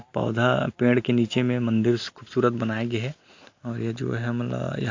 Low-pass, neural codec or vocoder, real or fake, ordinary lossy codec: 7.2 kHz; none; real; AAC, 48 kbps